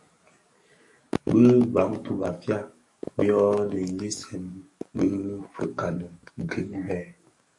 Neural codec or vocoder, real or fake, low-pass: codec, 44.1 kHz, 7.8 kbps, DAC; fake; 10.8 kHz